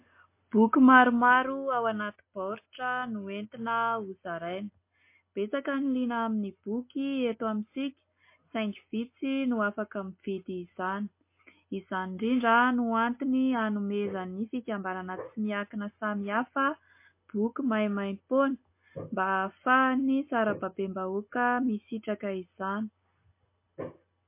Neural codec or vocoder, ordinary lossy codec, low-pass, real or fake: none; MP3, 24 kbps; 3.6 kHz; real